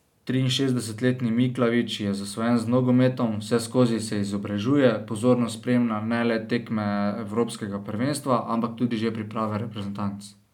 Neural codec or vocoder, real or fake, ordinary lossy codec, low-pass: none; real; none; 19.8 kHz